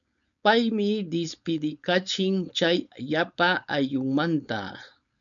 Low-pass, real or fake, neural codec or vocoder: 7.2 kHz; fake; codec, 16 kHz, 4.8 kbps, FACodec